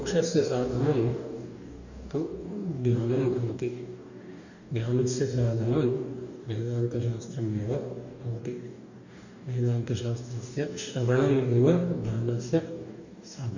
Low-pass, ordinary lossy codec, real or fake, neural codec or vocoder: 7.2 kHz; none; fake; codec, 44.1 kHz, 2.6 kbps, DAC